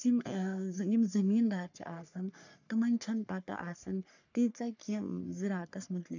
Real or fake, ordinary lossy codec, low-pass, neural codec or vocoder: fake; none; 7.2 kHz; codec, 44.1 kHz, 3.4 kbps, Pupu-Codec